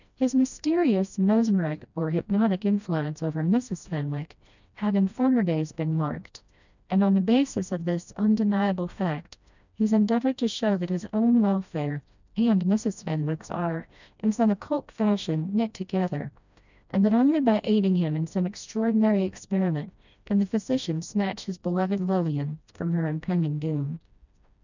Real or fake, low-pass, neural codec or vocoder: fake; 7.2 kHz; codec, 16 kHz, 1 kbps, FreqCodec, smaller model